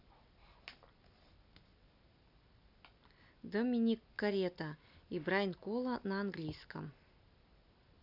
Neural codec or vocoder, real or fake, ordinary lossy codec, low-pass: none; real; none; 5.4 kHz